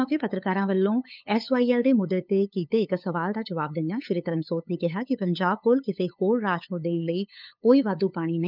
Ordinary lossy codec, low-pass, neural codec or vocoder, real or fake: none; 5.4 kHz; codec, 16 kHz, 8 kbps, FunCodec, trained on LibriTTS, 25 frames a second; fake